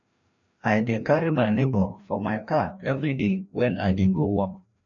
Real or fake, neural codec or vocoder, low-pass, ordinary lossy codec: fake; codec, 16 kHz, 1 kbps, FreqCodec, larger model; 7.2 kHz; none